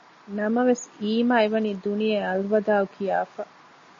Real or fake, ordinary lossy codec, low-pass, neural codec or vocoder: real; MP3, 32 kbps; 7.2 kHz; none